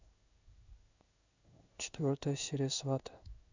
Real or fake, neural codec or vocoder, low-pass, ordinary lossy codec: fake; codec, 16 kHz in and 24 kHz out, 1 kbps, XY-Tokenizer; 7.2 kHz; Opus, 64 kbps